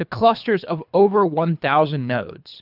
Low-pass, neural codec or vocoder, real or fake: 5.4 kHz; codec, 24 kHz, 3 kbps, HILCodec; fake